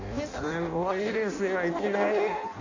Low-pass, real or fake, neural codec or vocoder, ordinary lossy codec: 7.2 kHz; fake; codec, 16 kHz in and 24 kHz out, 0.6 kbps, FireRedTTS-2 codec; none